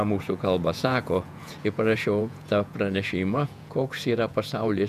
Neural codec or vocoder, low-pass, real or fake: vocoder, 48 kHz, 128 mel bands, Vocos; 14.4 kHz; fake